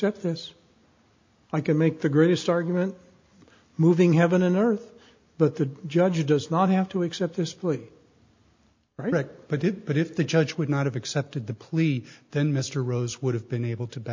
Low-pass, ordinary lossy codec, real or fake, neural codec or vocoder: 7.2 kHz; MP3, 48 kbps; real; none